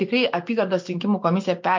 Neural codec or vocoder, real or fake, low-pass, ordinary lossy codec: none; real; 7.2 kHz; MP3, 48 kbps